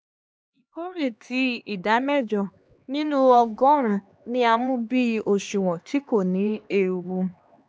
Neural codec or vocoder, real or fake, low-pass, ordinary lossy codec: codec, 16 kHz, 2 kbps, X-Codec, HuBERT features, trained on LibriSpeech; fake; none; none